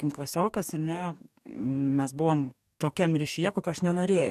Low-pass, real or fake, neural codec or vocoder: 14.4 kHz; fake; codec, 44.1 kHz, 2.6 kbps, DAC